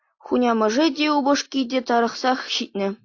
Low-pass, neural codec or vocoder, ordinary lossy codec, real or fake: 7.2 kHz; none; AAC, 48 kbps; real